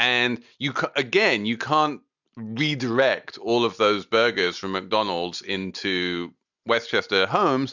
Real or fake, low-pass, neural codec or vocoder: real; 7.2 kHz; none